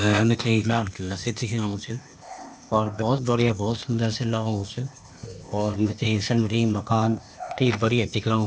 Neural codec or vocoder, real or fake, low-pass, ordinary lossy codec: codec, 16 kHz, 0.8 kbps, ZipCodec; fake; none; none